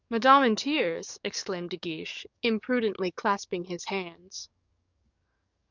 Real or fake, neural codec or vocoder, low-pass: fake; codec, 44.1 kHz, 7.8 kbps, DAC; 7.2 kHz